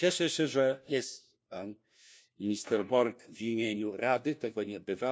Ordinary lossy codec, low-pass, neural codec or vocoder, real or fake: none; none; codec, 16 kHz, 1 kbps, FunCodec, trained on LibriTTS, 50 frames a second; fake